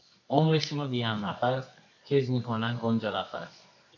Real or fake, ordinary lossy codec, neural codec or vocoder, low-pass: fake; AAC, 48 kbps; codec, 24 kHz, 0.9 kbps, WavTokenizer, medium music audio release; 7.2 kHz